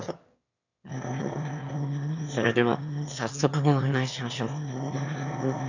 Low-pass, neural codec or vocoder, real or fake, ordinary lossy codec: 7.2 kHz; autoencoder, 22.05 kHz, a latent of 192 numbers a frame, VITS, trained on one speaker; fake; none